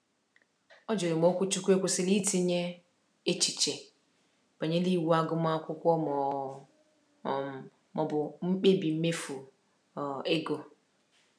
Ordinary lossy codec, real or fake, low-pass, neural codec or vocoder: none; real; none; none